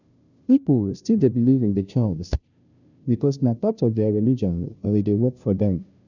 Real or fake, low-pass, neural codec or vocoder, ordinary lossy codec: fake; 7.2 kHz; codec, 16 kHz, 0.5 kbps, FunCodec, trained on Chinese and English, 25 frames a second; none